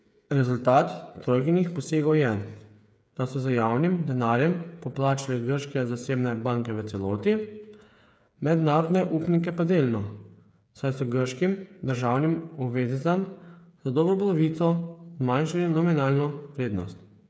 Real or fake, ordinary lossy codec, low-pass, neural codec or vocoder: fake; none; none; codec, 16 kHz, 8 kbps, FreqCodec, smaller model